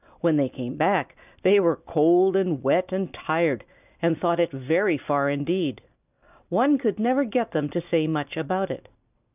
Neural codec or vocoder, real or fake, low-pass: none; real; 3.6 kHz